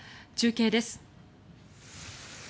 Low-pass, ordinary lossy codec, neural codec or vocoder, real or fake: none; none; none; real